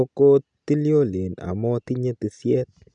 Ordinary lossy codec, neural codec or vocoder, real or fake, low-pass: none; none; real; 9.9 kHz